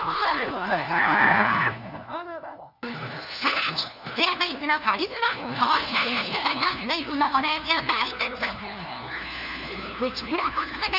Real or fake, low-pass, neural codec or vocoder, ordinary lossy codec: fake; 5.4 kHz; codec, 16 kHz, 1 kbps, FunCodec, trained on LibriTTS, 50 frames a second; none